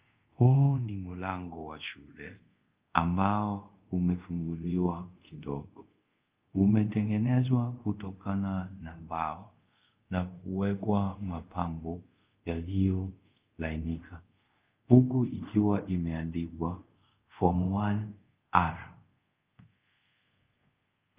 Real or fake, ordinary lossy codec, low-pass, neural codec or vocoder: fake; Opus, 24 kbps; 3.6 kHz; codec, 24 kHz, 0.5 kbps, DualCodec